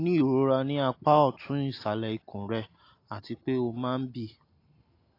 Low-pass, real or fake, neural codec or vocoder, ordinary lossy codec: 5.4 kHz; real; none; AAC, 32 kbps